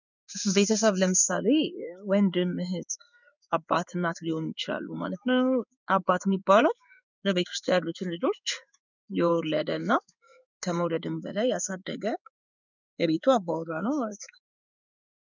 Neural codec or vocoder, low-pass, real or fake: codec, 16 kHz in and 24 kHz out, 1 kbps, XY-Tokenizer; 7.2 kHz; fake